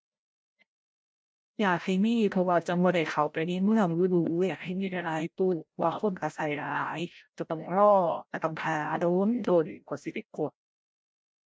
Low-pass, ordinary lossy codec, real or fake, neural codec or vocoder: none; none; fake; codec, 16 kHz, 0.5 kbps, FreqCodec, larger model